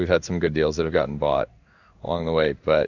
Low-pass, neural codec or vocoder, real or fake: 7.2 kHz; codec, 16 kHz in and 24 kHz out, 1 kbps, XY-Tokenizer; fake